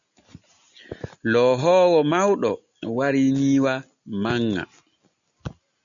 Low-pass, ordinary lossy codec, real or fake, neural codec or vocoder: 7.2 kHz; MP3, 96 kbps; real; none